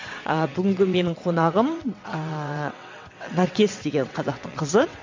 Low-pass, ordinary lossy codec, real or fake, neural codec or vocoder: 7.2 kHz; MP3, 48 kbps; fake; vocoder, 22.05 kHz, 80 mel bands, WaveNeXt